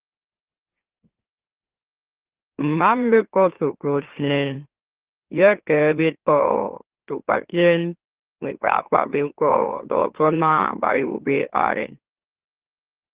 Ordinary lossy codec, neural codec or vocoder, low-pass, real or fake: Opus, 16 kbps; autoencoder, 44.1 kHz, a latent of 192 numbers a frame, MeloTTS; 3.6 kHz; fake